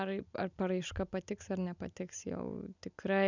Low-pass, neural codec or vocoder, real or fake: 7.2 kHz; none; real